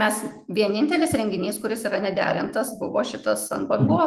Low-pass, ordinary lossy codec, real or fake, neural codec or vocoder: 14.4 kHz; Opus, 24 kbps; fake; autoencoder, 48 kHz, 128 numbers a frame, DAC-VAE, trained on Japanese speech